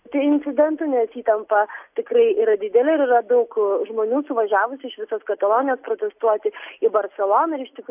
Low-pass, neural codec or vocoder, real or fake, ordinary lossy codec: 3.6 kHz; none; real; AAC, 32 kbps